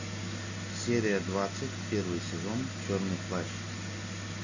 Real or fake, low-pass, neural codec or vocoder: real; 7.2 kHz; none